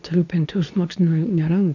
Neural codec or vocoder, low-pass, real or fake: codec, 24 kHz, 0.9 kbps, WavTokenizer, medium speech release version 2; 7.2 kHz; fake